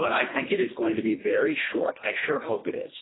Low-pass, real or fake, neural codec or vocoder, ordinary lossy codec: 7.2 kHz; fake; codec, 24 kHz, 1.5 kbps, HILCodec; AAC, 16 kbps